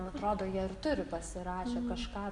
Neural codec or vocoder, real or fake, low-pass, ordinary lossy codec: none; real; 10.8 kHz; Opus, 64 kbps